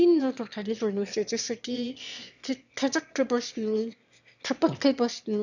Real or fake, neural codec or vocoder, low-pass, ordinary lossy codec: fake; autoencoder, 22.05 kHz, a latent of 192 numbers a frame, VITS, trained on one speaker; 7.2 kHz; none